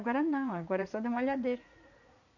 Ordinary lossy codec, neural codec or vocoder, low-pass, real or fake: none; vocoder, 44.1 kHz, 80 mel bands, Vocos; 7.2 kHz; fake